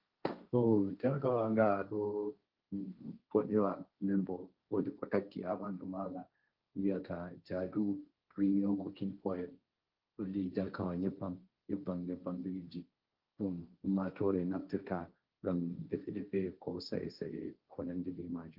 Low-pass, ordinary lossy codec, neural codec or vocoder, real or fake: 5.4 kHz; Opus, 32 kbps; codec, 16 kHz, 1.1 kbps, Voila-Tokenizer; fake